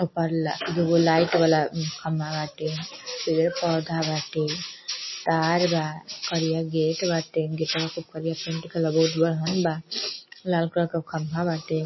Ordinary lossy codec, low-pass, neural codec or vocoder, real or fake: MP3, 24 kbps; 7.2 kHz; none; real